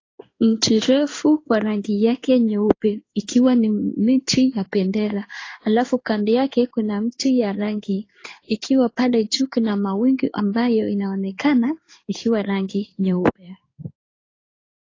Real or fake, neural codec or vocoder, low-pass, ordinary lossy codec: fake; codec, 16 kHz in and 24 kHz out, 1 kbps, XY-Tokenizer; 7.2 kHz; AAC, 32 kbps